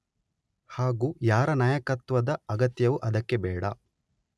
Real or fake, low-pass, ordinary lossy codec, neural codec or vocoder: real; none; none; none